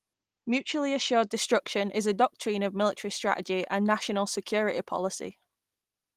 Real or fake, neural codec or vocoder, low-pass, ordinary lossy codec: real; none; 14.4 kHz; Opus, 24 kbps